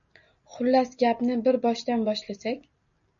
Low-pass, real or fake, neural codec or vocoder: 7.2 kHz; real; none